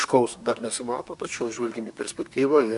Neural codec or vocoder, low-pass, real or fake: codec, 24 kHz, 1 kbps, SNAC; 10.8 kHz; fake